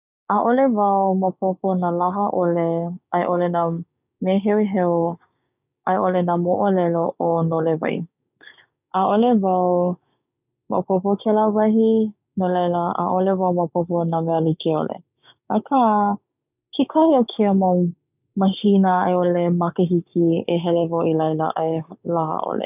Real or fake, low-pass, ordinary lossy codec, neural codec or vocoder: fake; 3.6 kHz; none; codec, 16 kHz, 6 kbps, DAC